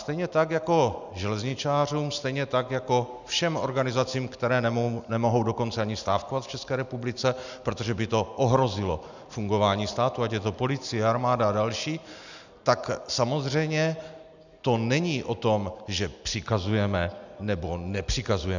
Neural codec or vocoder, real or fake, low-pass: none; real; 7.2 kHz